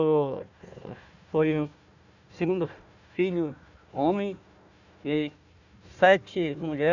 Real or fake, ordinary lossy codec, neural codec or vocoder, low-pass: fake; none; codec, 16 kHz, 1 kbps, FunCodec, trained on Chinese and English, 50 frames a second; 7.2 kHz